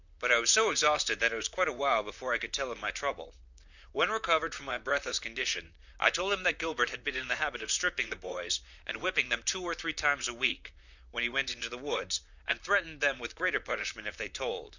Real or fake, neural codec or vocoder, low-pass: fake; vocoder, 44.1 kHz, 128 mel bands, Pupu-Vocoder; 7.2 kHz